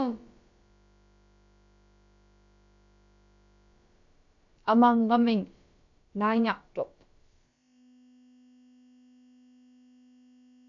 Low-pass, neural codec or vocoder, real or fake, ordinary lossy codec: 7.2 kHz; codec, 16 kHz, about 1 kbps, DyCAST, with the encoder's durations; fake; AAC, 64 kbps